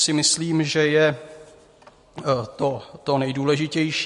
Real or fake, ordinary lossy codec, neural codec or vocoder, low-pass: real; MP3, 48 kbps; none; 14.4 kHz